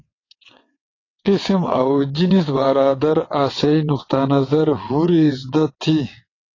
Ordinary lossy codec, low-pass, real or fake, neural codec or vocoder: AAC, 32 kbps; 7.2 kHz; fake; vocoder, 22.05 kHz, 80 mel bands, WaveNeXt